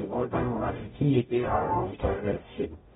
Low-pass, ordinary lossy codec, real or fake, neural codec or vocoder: 19.8 kHz; AAC, 16 kbps; fake; codec, 44.1 kHz, 0.9 kbps, DAC